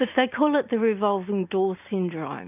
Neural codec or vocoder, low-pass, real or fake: none; 3.6 kHz; real